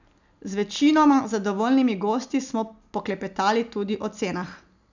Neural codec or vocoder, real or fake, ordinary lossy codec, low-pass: none; real; none; 7.2 kHz